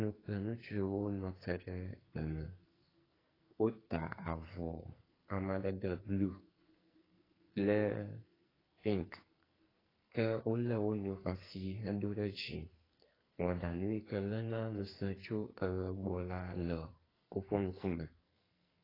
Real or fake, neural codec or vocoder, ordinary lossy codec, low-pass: fake; codec, 44.1 kHz, 2.6 kbps, SNAC; AAC, 24 kbps; 5.4 kHz